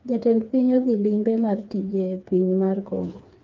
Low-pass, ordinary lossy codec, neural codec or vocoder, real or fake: 7.2 kHz; Opus, 24 kbps; codec, 16 kHz, 4 kbps, FreqCodec, smaller model; fake